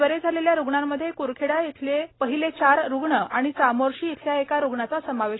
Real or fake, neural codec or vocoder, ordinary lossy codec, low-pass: real; none; AAC, 16 kbps; 7.2 kHz